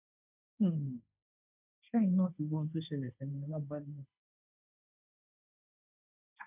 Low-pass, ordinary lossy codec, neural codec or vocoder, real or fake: 3.6 kHz; none; codec, 16 kHz, 1.1 kbps, Voila-Tokenizer; fake